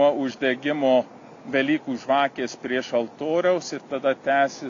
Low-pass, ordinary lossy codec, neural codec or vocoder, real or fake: 7.2 kHz; AAC, 48 kbps; none; real